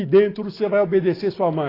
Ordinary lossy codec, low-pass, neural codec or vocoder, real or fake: AAC, 24 kbps; 5.4 kHz; none; real